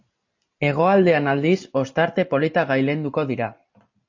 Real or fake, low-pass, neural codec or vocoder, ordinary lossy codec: real; 7.2 kHz; none; AAC, 48 kbps